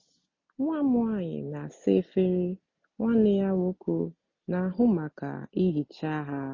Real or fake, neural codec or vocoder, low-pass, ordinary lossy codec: real; none; 7.2 kHz; MP3, 32 kbps